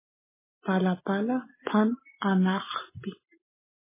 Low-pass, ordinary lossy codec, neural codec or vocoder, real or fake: 3.6 kHz; MP3, 16 kbps; none; real